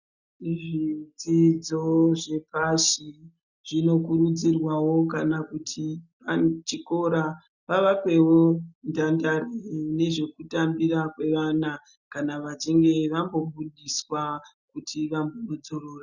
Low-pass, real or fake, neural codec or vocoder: 7.2 kHz; real; none